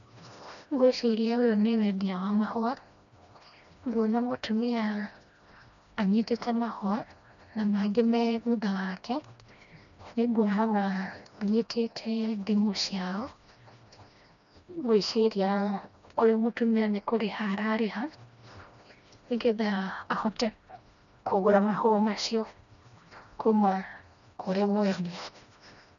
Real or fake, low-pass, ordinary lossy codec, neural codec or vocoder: fake; 7.2 kHz; none; codec, 16 kHz, 1 kbps, FreqCodec, smaller model